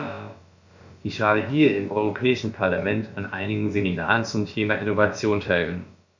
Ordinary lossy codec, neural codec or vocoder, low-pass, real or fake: MP3, 64 kbps; codec, 16 kHz, about 1 kbps, DyCAST, with the encoder's durations; 7.2 kHz; fake